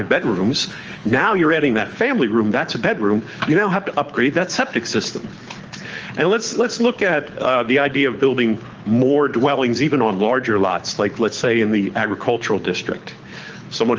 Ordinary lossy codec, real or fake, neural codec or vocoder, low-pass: Opus, 24 kbps; fake; codec, 24 kHz, 6 kbps, HILCodec; 7.2 kHz